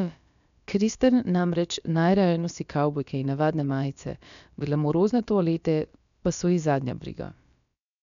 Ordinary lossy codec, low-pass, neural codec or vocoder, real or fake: none; 7.2 kHz; codec, 16 kHz, about 1 kbps, DyCAST, with the encoder's durations; fake